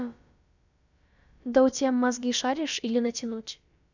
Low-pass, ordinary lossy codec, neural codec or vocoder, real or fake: 7.2 kHz; none; codec, 16 kHz, about 1 kbps, DyCAST, with the encoder's durations; fake